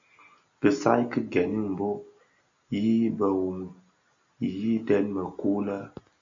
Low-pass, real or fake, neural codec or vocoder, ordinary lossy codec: 7.2 kHz; real; none; AAC, 64 kbps